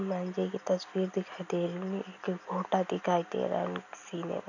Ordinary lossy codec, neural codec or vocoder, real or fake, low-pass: none; none; real; 7.2 kHz